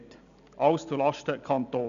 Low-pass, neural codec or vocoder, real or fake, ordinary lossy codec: 7.2 kHz; none; real; none